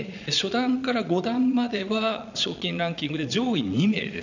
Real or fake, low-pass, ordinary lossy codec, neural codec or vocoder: fake; 7.2 kHz; none; vocoder, 22.05 kHz, 80 mel bands, Vocos